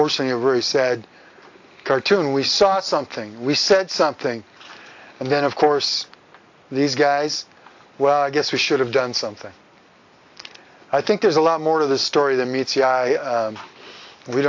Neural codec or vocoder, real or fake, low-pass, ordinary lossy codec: none; real; 7.2 kHz; AAC, 48 kbps